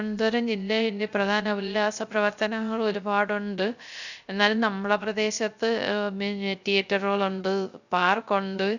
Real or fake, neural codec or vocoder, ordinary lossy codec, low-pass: fake; codec, 16 kHz, 0.3 kbps, FocalCodec; none; 7.2 kHz